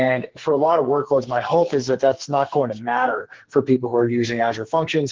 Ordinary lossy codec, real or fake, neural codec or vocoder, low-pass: Opus, 16 kbps; fake; codec, 44.1 kHz, 2.6 kbps, SNAC; 7.2 kHz